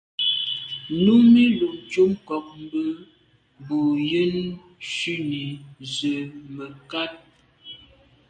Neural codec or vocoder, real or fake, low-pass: none; real; 9.9 kHz